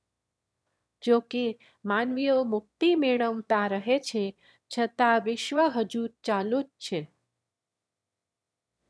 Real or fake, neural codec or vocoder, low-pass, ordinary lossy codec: fake; autoencoder, 22.05 kHz, a latent of 192 numbers a frame, VITS, trained on one speaker; none; none